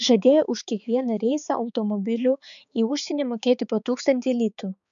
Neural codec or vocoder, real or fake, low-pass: codec, 16 kHz, 4 kbps, X-Codec, HuBERT features, trained on balanced general audio; fake; 7.2 kHz